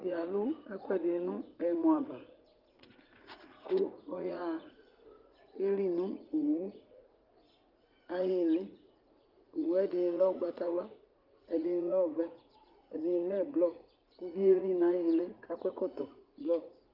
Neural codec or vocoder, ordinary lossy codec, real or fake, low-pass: vocoder, 44.1 kHz, 80 mel bands, Vocos; Opus, 32 kbps; fake; 5.4 kHz